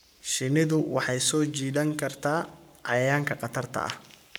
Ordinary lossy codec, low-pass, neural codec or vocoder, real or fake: none; none; codec, 44.1 kHz, 7.8 kbps, Pupu-Codec; fake